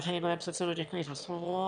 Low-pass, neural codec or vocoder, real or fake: 9.9 kHz; autoencoder, 22.05 kHz, a latent of 192 numbers a frame, VITS, trained on one speaker; fake